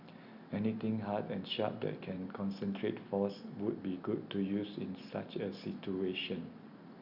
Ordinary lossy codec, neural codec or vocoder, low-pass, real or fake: Opus, 64 kbps; none; 5.4 kHz; real